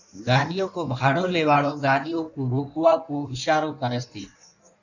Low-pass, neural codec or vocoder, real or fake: 7.2 kHz; codec, 16 kHz in and 24 kHz out, 1.1 kbps, FireRedTTS-2 codec; fake